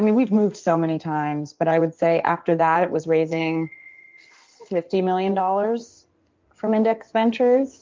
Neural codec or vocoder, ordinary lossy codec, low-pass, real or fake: codec, 16 kHz in and 24 kHz out, 2.2 kbps, FireRedTTS-2 codec; Opus, 24 kbps; 7.2 kHz; fake